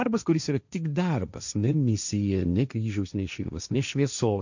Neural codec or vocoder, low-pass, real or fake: codec, 16 kHz, 1.1 kbps, Voila-Tokenizer; 7.2 kHz; fake